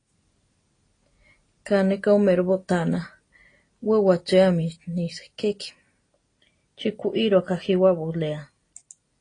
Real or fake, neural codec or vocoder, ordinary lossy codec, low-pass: real; none; AAC, 32 kbps; 9.9 kHz